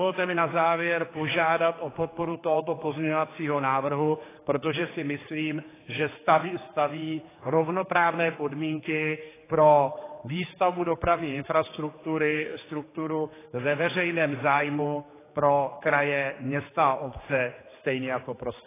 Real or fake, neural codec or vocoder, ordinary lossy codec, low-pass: fake; codec, 16 kHz, 4 kbps, X-Codec, HuBERT features, trained on general audio; AAC, 16 kbps; 3.6 kHz